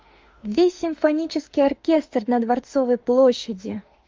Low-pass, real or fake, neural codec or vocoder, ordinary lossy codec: 7.2 kHz; fake; autoencoder, 48 kHz, 32 numbers a frame, DAC-VAE, trained on Japanese speech; Opus, 32 kbps